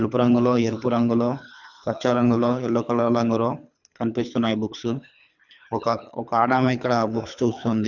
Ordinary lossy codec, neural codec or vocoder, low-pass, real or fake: none; codec, 24 kHz, 3 kbps, HILCodec; 7.2 kHz; fake